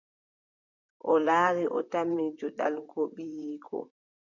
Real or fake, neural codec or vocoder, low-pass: fake; vocoder, 44.1 kHz, 128 mel bands, Pupu-Vocoder; 7.2 kHz